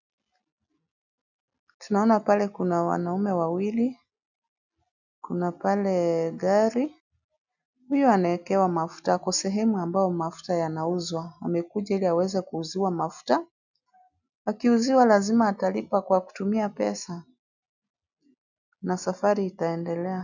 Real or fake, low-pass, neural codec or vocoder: real; 7.2 kHz; none